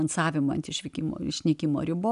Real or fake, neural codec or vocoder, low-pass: real; none; 10.8 kHz